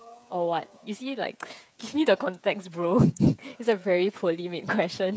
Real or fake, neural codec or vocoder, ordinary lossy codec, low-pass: fake; codec, 16 kHz, 8 kbps, FreqCodec, smaller model; none; none